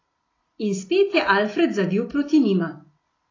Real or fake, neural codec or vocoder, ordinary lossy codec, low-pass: real; none; AAC, 32 kbps; 7.2 kHz